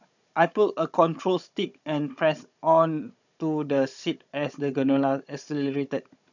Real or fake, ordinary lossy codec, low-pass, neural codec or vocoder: fake; none; 7.2 kHz; codec, 16 kHz, 16 kbps, FunCodec, trained on Chinese and English, 50 frames a second